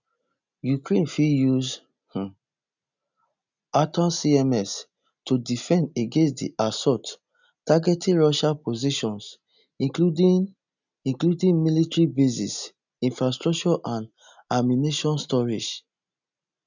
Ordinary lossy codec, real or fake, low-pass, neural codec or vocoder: none; real; 7.2 kHz; none